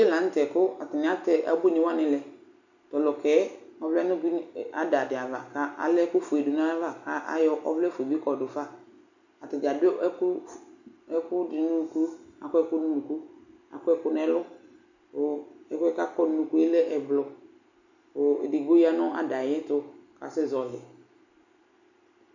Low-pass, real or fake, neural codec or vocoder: 7.2 kHz; real; none